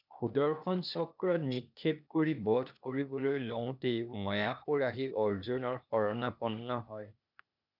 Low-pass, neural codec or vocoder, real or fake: 5.4 kHz; codec, 16 kHz, 0.8 kbps, ZipCodec; fake